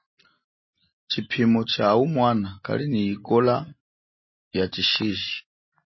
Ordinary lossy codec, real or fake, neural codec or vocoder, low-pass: MP3, 24 kbps; real; none; 7.2 kHz